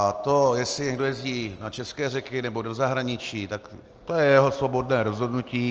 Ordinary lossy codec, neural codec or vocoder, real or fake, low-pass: Opus, 24 kbps; none; real; 7.2 kHz